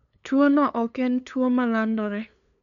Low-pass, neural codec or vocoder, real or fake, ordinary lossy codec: 7.2 kHz; codec, 16 kHz, 2 kbps, FunCodec, trained on LibriTTS, 25 frames a second; fake; none